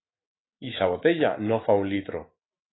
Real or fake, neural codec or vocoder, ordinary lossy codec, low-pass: fake; autoencoder, 48 kHz, 128 numbers a frame, DAC-VAE, trained on Japanese speech; AAC, 16 kbps; 7.2 kHz